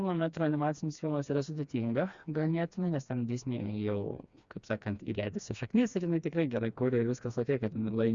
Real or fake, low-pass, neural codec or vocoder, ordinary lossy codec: fake; 7.2 kHz; codec, 16 kHz, 2 kbps, FreqCodec, smaller model; Opus, 64 kbps